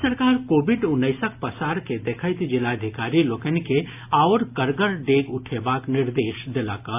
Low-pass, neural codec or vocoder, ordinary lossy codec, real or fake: 3.6 kHz; none; AAC, 32 kbps; real